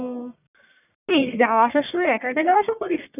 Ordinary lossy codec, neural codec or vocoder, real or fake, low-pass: none; codec, 44.1 kHz, 1.7 kbps, Pupu-Codec; fake; 3.6 kHz